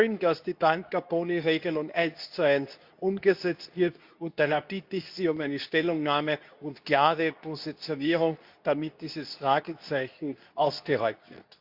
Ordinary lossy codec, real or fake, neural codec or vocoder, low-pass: Opus, 64 kbps; fake; codec, 24 kHz, 0.9 kbps, WavTokenizer, medium speech release version 2; 5.4 kHz